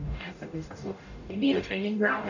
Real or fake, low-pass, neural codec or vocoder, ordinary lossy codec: fake; 7.2 kHz; codec, 44.1 kHz, 0.9 kbps, DAC; none